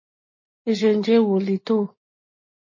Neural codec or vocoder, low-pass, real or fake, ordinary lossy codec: none; 7.2 kHz; real; MP3, 32 kbps